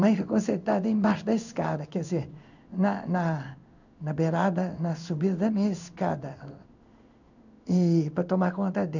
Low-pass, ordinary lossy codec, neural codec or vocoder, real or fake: 7.2 kHz; none; codec, 16 kHz in and 24 kHz out, 1 kbps, XY-Tokenizer; fake